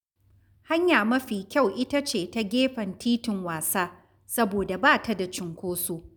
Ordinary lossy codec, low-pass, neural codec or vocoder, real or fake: none; none; none; real